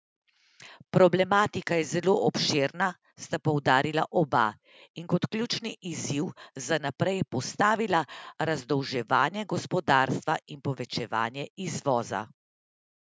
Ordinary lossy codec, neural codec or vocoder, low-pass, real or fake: none; none; none; real